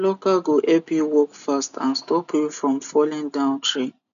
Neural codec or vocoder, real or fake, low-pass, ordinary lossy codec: none; real; 7.2 kHz; none